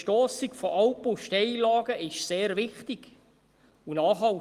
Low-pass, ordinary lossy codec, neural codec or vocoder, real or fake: 14.4 kHz; Opus, 24 kbps; none; real